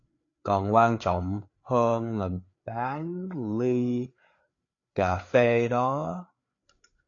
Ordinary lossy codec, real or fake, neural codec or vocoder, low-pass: AAC, 48 kbps; fake; codec, 16 kHz, 4 kbps, FreqCodec, larger model; 7.2 kHz